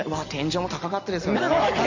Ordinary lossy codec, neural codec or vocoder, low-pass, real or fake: Opus, 64 kbps; none; 7.2 kHz; real